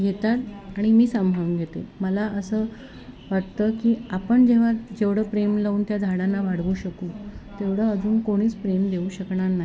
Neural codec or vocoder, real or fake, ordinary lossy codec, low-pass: none; real; none; none